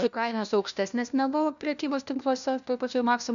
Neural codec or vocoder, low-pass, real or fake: codec, 16 kHz, 1 kbps, FunCodec, trained on LibriTTS, 50 frames a second; 7.2 kHz; fake